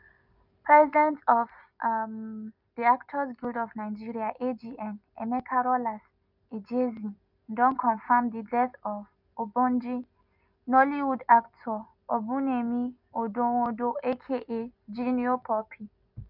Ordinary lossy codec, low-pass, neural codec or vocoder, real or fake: none; 5.4 kHz; none; real